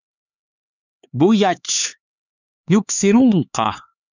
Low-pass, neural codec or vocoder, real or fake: 7.2 kHz; codec, 16 kHz, 4 kbps, X-Codec, HuBERT features, trained on LibriSpeech; fake